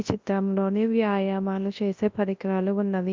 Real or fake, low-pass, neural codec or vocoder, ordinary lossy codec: fake; 7.2 kHz; codec, 24 kHz, 0.9 kbps, WavTokenizer, large speech release; Opus, 24 kbps